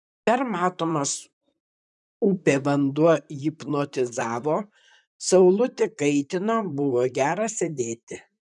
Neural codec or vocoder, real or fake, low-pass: vocoder, 44.1 kHz, 128 mel bands, Pupu-Vocoder; fake; 10.8 kHz